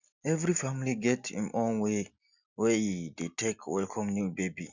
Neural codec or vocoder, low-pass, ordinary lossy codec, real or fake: none; 7.2 kHz; none; real